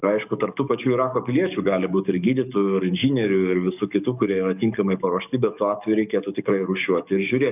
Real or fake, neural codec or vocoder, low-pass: fake; codec, 44.1 kHz, 7.8 kbps, DAC; 3.6 kHz